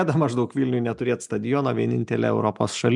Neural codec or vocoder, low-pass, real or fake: none; 10.8 kHz; real